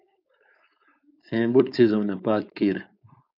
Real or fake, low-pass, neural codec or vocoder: fake; 5.4 kHz; codec, 16 kHz, 4.8 kbps, FACodec